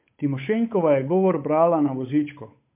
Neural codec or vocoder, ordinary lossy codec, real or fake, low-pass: codec, 16 kHz, 8 kbps, FunCodec, trained on Chinese and English, 25 frames a second; MP3, 32 kbps; fake; 3.6 kHz